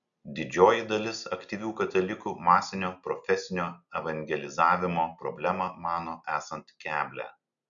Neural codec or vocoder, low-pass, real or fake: none; 7.2 kHz; real